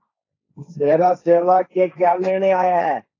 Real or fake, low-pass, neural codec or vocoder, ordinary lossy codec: fake; 7.2 kHz; codec, 16 kHz, 1.1 kbps, Voila-Tokenizer; AAC, 32 kbps